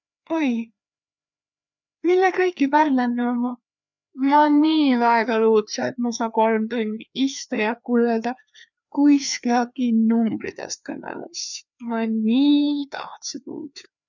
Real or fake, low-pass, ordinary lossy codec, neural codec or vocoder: fake; 7.2 kHz; none; codec, 16 kHz, 2 kbps, FreqCodec, larger model